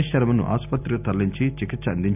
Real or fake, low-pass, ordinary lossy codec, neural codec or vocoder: real; 3.6 kHz; none; none